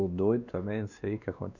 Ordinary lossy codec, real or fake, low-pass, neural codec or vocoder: none; fake; 7.2 kHz; codec, 16 kHz, 2 kbps, X-Codec, WavLM features, trained on Multilingual LibriSpeech